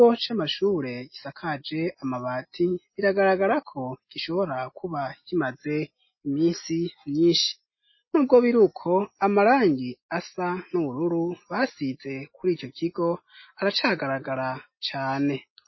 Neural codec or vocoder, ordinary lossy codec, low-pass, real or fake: none; MP3, 24 kbps; 7.2 kHz; real